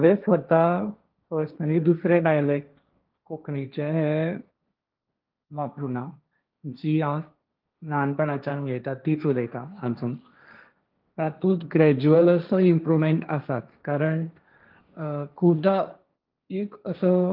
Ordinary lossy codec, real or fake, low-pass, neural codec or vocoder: Opus, 32 kbps; fake; 5.4 kHz; codec, 16 kHz, 1.1 kbps, Voila-Tokenizer